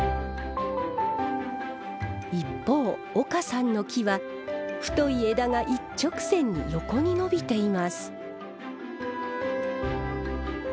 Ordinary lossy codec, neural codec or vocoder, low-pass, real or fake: none; none; none; real